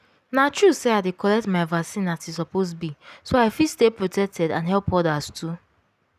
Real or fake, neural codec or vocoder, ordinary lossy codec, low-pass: real; none; none; 14.4 kHz